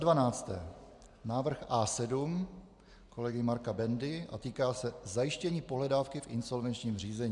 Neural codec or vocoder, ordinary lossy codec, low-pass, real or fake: none; MP3, 96 kbps; 10.8 kHz; real